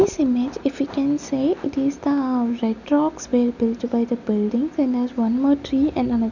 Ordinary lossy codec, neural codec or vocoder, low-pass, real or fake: none; none; 7.2 kHz; real